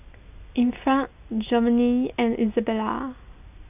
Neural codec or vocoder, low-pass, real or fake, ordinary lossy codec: none; 3.6 kHz; real; none